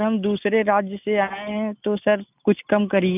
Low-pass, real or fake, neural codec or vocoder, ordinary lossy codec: 3.6 kHz; real; none; none